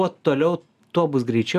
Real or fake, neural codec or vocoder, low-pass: real; none; 14.4 kHz